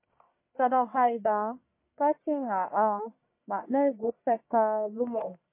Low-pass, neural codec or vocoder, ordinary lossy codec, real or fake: 3.6 kHz; codec, 44.1 kHz, 1.7 kbps, Pupu-Codec; MP3, 24 kbps; fake